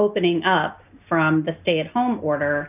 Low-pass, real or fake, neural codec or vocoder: 3.6 kHz; real; none